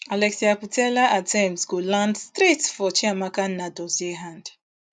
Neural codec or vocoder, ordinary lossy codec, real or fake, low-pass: none; none; real; none